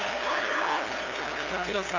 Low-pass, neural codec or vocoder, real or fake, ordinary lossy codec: 7.2 kHz; codec, 16 kHz, 2 kbps, FunCodec, trained on LibriTTS, 25 frames a second; fake; AAC, 48 kbps